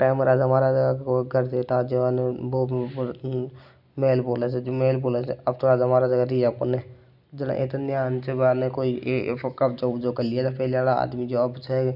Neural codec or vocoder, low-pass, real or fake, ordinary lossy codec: none; 5.4 kHz; real; none